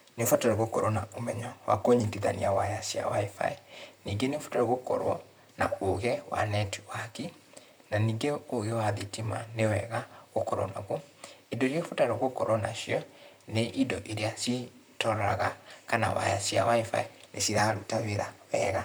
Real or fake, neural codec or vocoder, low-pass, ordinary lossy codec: fake; vocoder, 44.1 kHz, 128 mel bands, Pupu-Vocoder; none; none